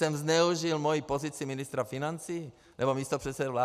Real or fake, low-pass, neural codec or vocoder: real; 14.4 kHz; none